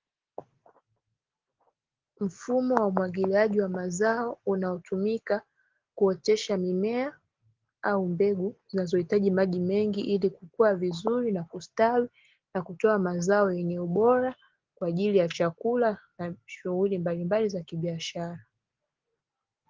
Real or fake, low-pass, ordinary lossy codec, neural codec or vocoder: real; 7.2 kHz; Opus, 16 kbps; none